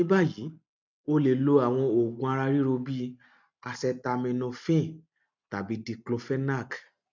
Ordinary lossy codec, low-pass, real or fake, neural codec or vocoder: none; 7.2 kHz; real; none